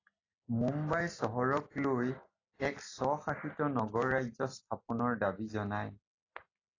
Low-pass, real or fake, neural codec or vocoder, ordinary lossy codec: 7.2 kHz; real; none; AAC, 32 kbps